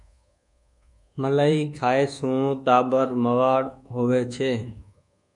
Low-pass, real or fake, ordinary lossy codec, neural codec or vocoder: 10.8 kHz; fake; MP3, 96 kbps; codec, 24 kHz, 1.2 kbps, DualCodec